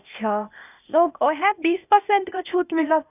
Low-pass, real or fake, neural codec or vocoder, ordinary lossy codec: 3.6 kHz; fake; codec, 16 kHz, 0.8 kbps, ZipCodec; none